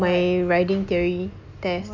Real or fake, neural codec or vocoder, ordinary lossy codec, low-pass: real; none; none; 7.2 kHz